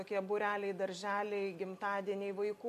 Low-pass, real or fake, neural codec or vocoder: 14.4 kHz; real; none